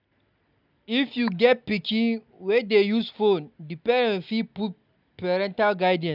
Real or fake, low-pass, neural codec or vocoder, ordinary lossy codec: real; 5.4 kHz; none; none